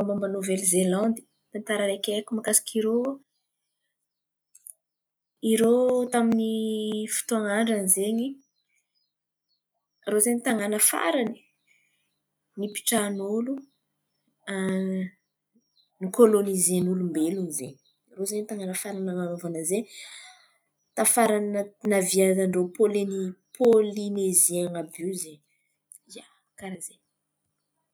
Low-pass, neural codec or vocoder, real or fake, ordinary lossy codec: none; none; real; none